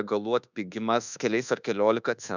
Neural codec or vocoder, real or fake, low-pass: codec, 24 kHz, 1.2 kbps, DualCodec; fake; 7.2 kHz